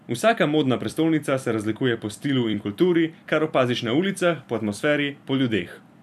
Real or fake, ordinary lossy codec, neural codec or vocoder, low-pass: fake; none; vocoder, 44.1 kHz, 128 mel bands every 256 samples, BigVGAN v2; 14.4 kHz